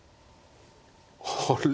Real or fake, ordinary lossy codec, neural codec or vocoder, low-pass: real; none; none; none